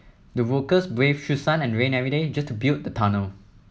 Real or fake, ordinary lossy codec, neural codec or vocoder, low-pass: real; none; none; none